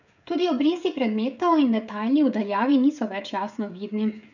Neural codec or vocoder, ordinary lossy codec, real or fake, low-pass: codec, 16 kHz, 16 kbps, FreqCodec, smaller model; none; fake; 7.2 kHz